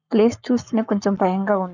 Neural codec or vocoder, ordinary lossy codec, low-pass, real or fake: codec, 44.1 kHz, 7.8 kbps, Pupu-Codec; none; 7.2 kHz; fake